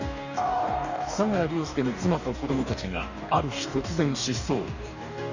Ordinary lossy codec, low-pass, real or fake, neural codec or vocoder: none; 7.2 kHz; fake; codec, 44.1 kHz, 2.6 kbps, DAC